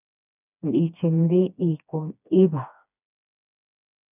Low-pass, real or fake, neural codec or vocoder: 3.6 kHz; fake; codec, 16 kHz, 2 kbps, FreqCodec, smaller model